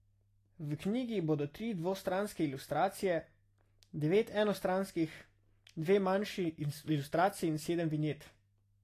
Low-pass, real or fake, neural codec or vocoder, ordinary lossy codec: 14.4 kHz; real; none; AAC, 48 kbps